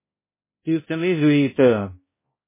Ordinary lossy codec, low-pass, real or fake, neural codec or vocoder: MP3, 16 kbps; 3.6 kHz; fake; codec, 16 kHz, 0.5 kbps, X-Codec, HuBERT features, trained on balanced general audio